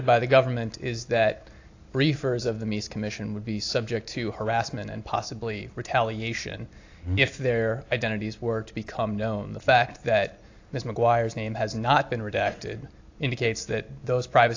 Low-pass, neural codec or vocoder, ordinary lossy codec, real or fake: 7.2 kHz; none; AAC, 48 kbps; real